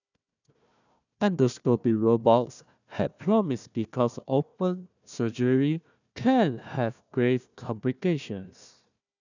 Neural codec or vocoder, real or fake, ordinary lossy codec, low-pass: codec, 16 kHz, 1 kbps, FunCodec, trained on Chinese and English, 50 frames a second; fake; none; 7.2 kHz